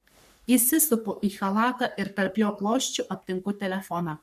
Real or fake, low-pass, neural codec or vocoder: fake; 14.4 kHz; codec, 44.1 kHz, 3.4 kbps, Pupu-Codec